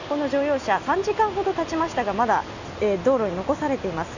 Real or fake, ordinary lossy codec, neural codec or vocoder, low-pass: real; none; none; 7.2 kHz